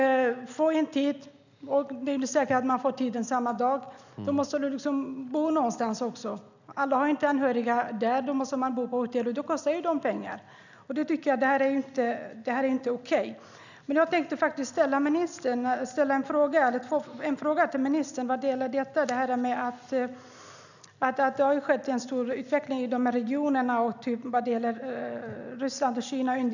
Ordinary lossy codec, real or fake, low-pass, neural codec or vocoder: none; real; 7.2 kHz; none